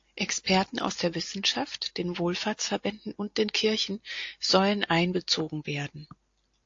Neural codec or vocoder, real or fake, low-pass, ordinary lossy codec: none; real; 7.2 kHz; AAC, 48 kbps